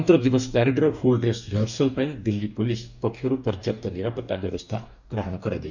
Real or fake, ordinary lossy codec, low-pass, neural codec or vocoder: fake; none; 7.2 kHz; codec, 44.1 kHz, 2.6 kbps, DAC